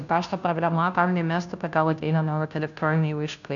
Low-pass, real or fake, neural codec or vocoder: 7.2 kHz; fake; codec, 16 kHz, 0.5 kbps, FunCodec, trained on Chinese and English, 25 frames a second